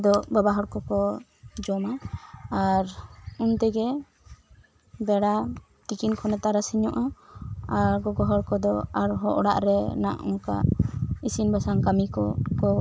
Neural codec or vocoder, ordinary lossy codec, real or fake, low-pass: none; none; real; none